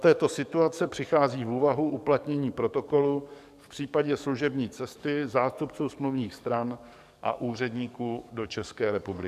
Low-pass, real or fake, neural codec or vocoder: 14.4 kHz; fake; codec, 44.1 kHz, 7.8 kbps, DAC